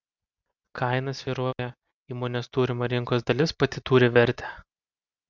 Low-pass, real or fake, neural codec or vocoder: 7.2 kHz; real; none